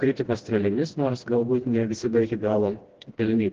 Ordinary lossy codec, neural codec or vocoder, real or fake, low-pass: Opus, 16 kbps; codec, 16 kHz, 1 kbps, FreqCodec, smaller model; fake; 7.2 kHz